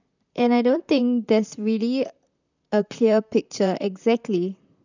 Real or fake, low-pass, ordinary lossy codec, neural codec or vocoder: fake; 7.2 kHz; none; vocoder, 44.1 kHz, 128 mel bands, Pupu-Vocoder